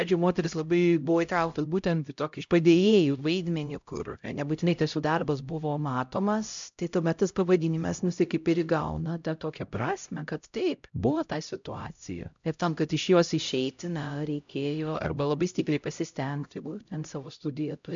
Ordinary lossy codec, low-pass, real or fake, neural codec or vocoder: MP3, 64 kbps; 7.2 kHz; fake; codec, 16 kHz, 0.5 kbps, X-Codec, HuBERT features, trained on LibriSpeech